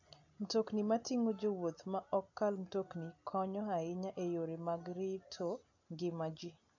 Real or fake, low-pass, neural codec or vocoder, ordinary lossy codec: real; 7.2 kHz; none; AAC, 48 kbps